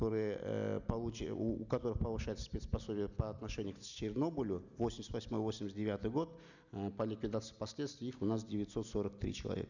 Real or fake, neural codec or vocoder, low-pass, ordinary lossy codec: real; none; 7.2 kHz; none